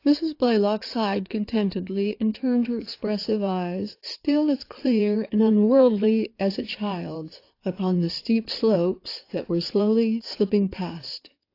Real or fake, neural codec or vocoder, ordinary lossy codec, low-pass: fake; codec, 16 kHz in and 24 kHz out, 2.2 kbps, FireRedTTS-2 codec; AAC, 32 kbps; 5.4 kHz